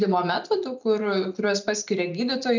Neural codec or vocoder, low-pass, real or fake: none; 7.2 kHz; real